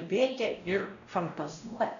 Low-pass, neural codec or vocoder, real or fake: 7.2 kHz; codec, 16 kHz, 0.5 kbps, X-Codec, WavLM features, trained on Multilingual LibriSpeech; fake